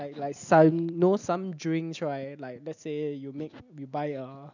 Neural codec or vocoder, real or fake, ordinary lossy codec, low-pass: none; real; none; 7.2 kHz